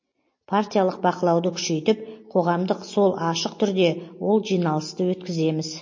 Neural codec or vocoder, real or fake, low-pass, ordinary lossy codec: none; real; 7.2 kHz; MP3, 32 kbps